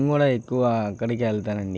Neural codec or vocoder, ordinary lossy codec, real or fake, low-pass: none; none; real; none